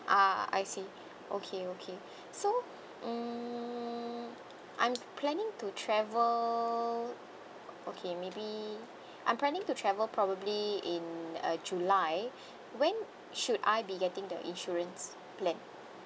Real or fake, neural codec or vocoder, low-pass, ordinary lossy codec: real; none; none; none